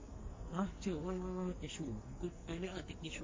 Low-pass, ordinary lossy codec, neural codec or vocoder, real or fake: 7.2 kHz; MP3, 32 kbps; codec, 44.1 kHz, 2.6 kbps, SNAC; fake